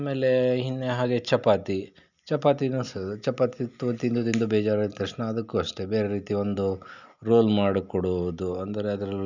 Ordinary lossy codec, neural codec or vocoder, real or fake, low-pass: none; none; real; 7.2 kHz